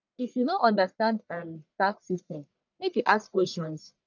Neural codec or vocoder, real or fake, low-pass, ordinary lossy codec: codec, 44.1 kHz, 1.7 kbps, Pupu-Codec; fake; 7.2 kHz; none